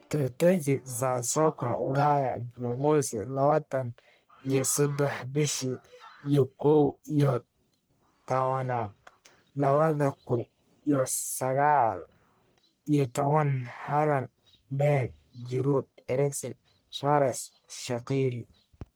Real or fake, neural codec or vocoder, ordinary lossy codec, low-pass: fake; codec, 44.1 kHz, 1.7 kbps, Pupu-Codec; none; none